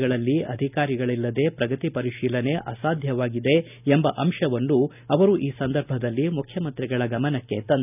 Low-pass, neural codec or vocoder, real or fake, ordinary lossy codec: 3.6 kHz; none; real; none